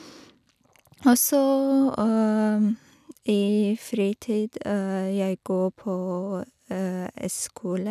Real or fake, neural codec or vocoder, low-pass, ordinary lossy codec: real; none; 14.4 kHz; none